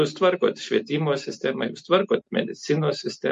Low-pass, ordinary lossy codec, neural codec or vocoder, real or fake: 14.4 kHz; MP3, 48 kbps; none; real